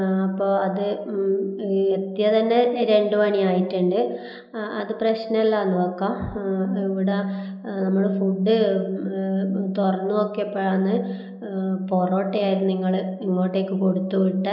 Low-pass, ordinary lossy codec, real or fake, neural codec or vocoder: 5.4 kHz; none; real; none